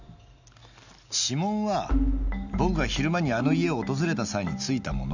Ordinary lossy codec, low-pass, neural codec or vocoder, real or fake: none; 7.2 kHz; none; real